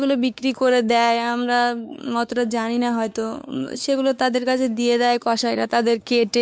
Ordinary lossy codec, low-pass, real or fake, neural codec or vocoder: none; none; fake; codec, 16 kHz, 4 kbps, X-Codec, WavLM features, trained on Multilingual LibriSpeech